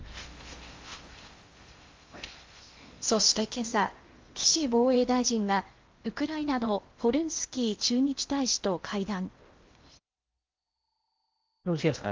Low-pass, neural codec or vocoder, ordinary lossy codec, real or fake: 7.2 kHz; codec, 16 kHz in and 24 kHz out, 0.8 kbps, FocalCodec, streaming, 65536 codes; Opus, 32 kbps; fake